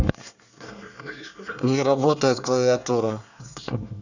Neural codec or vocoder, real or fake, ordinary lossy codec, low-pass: codec, 24 kHz, 1 kbps, SNAC; fake; none; 7.2 kHz